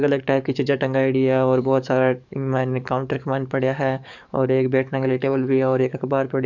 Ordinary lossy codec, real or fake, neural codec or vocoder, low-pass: none; fake; codec, 44.1 kHz, 7.8 kbps, DAC; 7.2 kHz